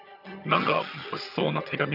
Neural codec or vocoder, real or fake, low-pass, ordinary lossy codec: vocoder, 22.05 kHz, 80 mel bands, HiFi-GAN; fake; 5.4 kHz; none